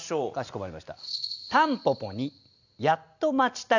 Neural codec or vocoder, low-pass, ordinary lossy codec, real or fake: none; 7.2 kHz; none; real